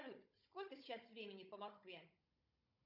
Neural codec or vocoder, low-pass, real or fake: codec, 16 kHz, 16 kbps, FunCodec, trained on Chinese and English, 50 frames a second; 5.4 kHz; fake